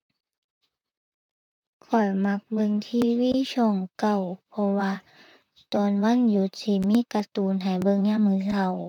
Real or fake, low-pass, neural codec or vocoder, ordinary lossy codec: fake; 19.8 kHz; vocoder, 44.1 kHz, 128 mel bands, Pupu-Vocoder; none